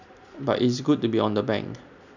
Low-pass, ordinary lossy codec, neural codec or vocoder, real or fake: 7.2 kHz; none; none; real